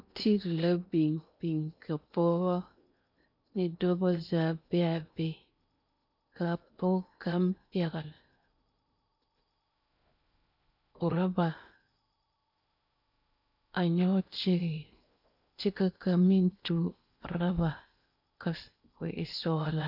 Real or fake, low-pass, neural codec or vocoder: fake; 5.4 kHz; codec, 16 kHz in and 24 kHz out, 0.8 kbps, FocalCodec, streaming, 65536 codes